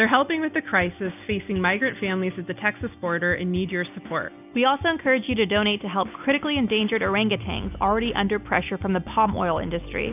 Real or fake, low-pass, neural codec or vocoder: real; 3.6 kHz; none